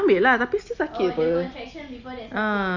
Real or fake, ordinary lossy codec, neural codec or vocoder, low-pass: real; Opus, 64 kbps; none; 7.2 kHz